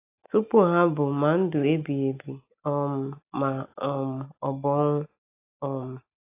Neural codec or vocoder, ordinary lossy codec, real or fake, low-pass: none; AAC, 24 kbps; real; 3.6 kHz